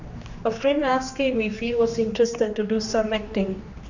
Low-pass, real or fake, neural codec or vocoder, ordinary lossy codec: 7.2 kHz; fake; codec, 16 kHz, 2 kbps, X-Codec, HuBERT features, trained on general audio; none